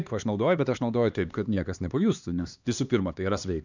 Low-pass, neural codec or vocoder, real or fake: 7.2 kHz; codec, 16 kHz, 2 kbps, X-Codec, HuBERT features, trained on LibriSpeech; fake